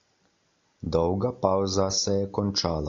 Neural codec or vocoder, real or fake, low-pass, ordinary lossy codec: none; real; 7.2 kHz; AAC, 48 kbps